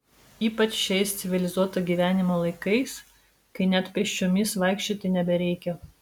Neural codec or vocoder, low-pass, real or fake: none; 19.8 kHz; real